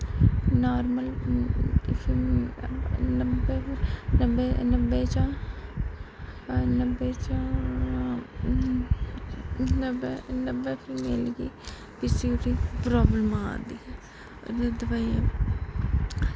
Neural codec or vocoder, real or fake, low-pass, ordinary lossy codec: none; real; none; none